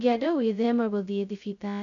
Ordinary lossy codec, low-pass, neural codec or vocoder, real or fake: none; 7.2 kHz; codec, 16 kHz, 0.2 kbps, FocalCodec; fake